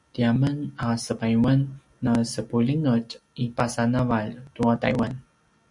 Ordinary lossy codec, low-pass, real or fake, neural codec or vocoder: MP3, 96 kbps; 10.8 kHz; real; none